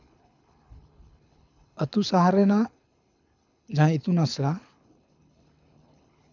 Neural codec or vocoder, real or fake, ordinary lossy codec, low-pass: codec, 24 kHz, 6 kbps, HILCodec; fake; none; 7.2 kHz